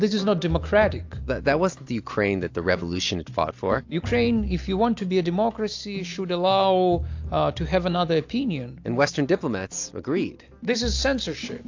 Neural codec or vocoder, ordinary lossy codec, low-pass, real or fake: none; AAC, 48 kbps; 7.2 kHz; real